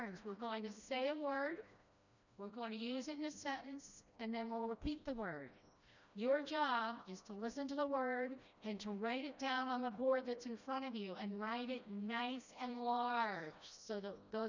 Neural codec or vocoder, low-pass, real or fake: codec, 16 kHz, 1 kbps, FreqCodec, smaller model; 7.2 kHz; fake